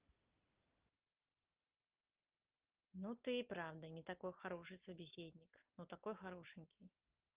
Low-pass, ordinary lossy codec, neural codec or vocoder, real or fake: 3.6 kHz; Opus, 32 kbps; none; real